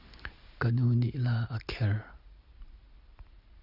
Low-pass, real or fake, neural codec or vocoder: 5.4 kHz; real; none